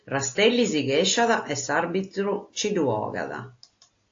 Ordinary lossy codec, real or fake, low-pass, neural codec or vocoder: AAC, 48 kbps; real; 7.2 kHz; none